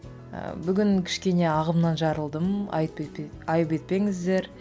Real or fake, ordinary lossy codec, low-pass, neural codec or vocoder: real; none; none; none